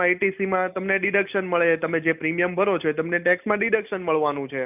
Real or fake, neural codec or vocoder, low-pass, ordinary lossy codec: real; none; 3.6 kHz; none